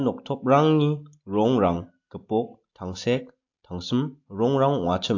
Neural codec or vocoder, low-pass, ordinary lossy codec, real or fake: none; 7.2 kHz; AAC, 48 kbps; real